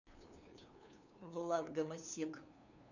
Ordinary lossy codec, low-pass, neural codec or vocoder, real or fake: none; 7.2 kHz; codec, 16 kHz, 2 kbps, FunCodec, trained on LibriTTS, 25 frames a second; fake